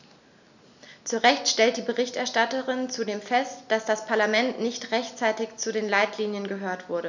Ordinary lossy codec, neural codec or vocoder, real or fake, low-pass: none; none; real; 7.2 kHz